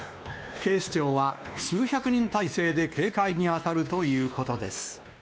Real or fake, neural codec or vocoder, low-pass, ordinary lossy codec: fake; codec, 16 kHz, 2 kbps, X-Codec, WavLM features, trained on Multilingual LibriSpeech; none; none